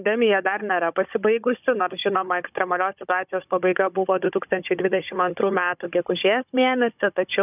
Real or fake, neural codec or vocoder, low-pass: fake; codec, 16 kHz, 16 kbps, FunCodec, trained on Chinese and English, 50 frames a second; 3.6 kHz